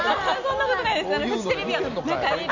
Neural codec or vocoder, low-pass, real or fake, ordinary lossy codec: none; 7.2 kHz; real; none